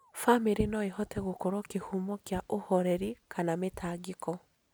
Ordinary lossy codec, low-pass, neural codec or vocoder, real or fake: none; none; none; real